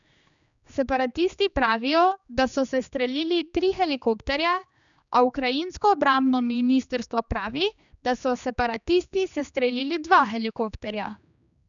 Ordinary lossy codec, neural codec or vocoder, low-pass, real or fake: none; codec, 16 kHz, 2 kbps, X-Codec, HuBERT features, trained on general audio; 7.2 kHz; fake